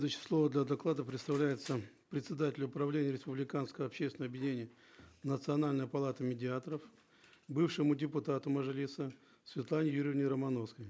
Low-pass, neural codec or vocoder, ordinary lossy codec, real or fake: none; none; none; real